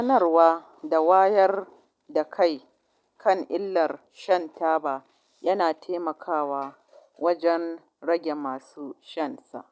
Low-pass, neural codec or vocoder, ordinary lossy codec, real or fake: none; none; none; real